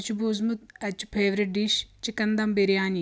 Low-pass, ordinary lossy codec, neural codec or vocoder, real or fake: none; none; none; real